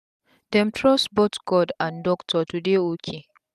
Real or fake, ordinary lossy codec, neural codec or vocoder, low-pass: real; none; none; 14.4 kHz